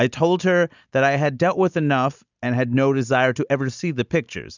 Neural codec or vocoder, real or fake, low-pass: none; real; 7.2 kHz